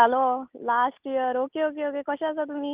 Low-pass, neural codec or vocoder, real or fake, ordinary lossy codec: 3.6 kHz; none; real; Opus, 24 kbps